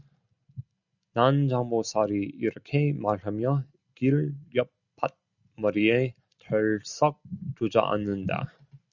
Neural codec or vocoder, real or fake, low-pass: none; real; 7.2 kHz